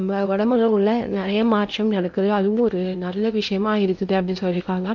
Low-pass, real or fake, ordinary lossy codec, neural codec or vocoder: 7.2 kHz; fake; none; codec, 16 kHz in and 24 kHz out, 0.8 kbps, FocalCodec, streaming, 65536 codes